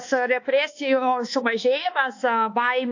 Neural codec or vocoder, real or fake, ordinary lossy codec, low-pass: codec, 24 kHz, 1.2 kbps, DualCodec; fake; AAC, 48 kbps; 7.2 kHz